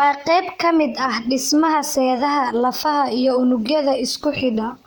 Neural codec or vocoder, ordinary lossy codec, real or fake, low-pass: vocoder, 44.1 kHz, 128 mel bands, Pupu-Vocoder; none; fake; none